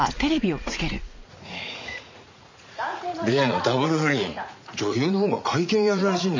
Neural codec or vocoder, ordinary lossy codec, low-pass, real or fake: vocoder, 44.1 kHz, 80 mel bands, Vocos; MP3, 64 kbps; 7.2 kHz; fake